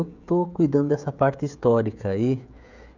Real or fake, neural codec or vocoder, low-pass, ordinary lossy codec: fake; codec, 16 kHz, 16 kbps, FreqCodec, smaller model; 7.2 kHz; none